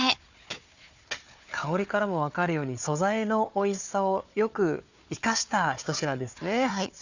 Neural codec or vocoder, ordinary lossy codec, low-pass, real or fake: codec, 16 kHz, 4 kbps, FunCodec, trained on Chinese and English, 50 frames a second; none; 7.2 kHz; fake